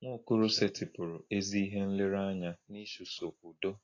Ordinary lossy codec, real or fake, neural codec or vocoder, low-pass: AAC, 32 kbps; real; none; 7.2 kHz